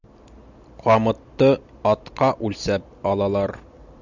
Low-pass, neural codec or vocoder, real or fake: 7.2 kHz; none; real